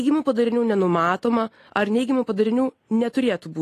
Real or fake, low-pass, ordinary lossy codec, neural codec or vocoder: real; 14.4 kHz; AAC, 48 kbps; none